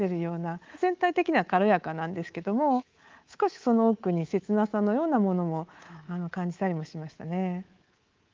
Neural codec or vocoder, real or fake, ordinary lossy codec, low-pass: codec, 24 kHz, 3.1 kbps, DualCodec; fake; Opus, 32 kbps; 7.2 kHz